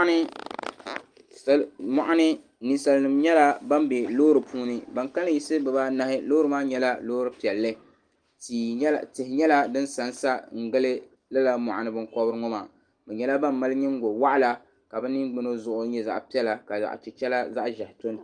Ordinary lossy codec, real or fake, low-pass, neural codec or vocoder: Opus, 32 kbps; real; 9.9 kHz; none